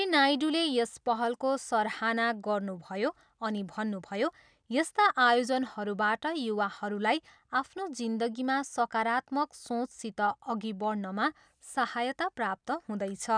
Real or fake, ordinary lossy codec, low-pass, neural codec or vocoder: real; none; 9.9 kHz; none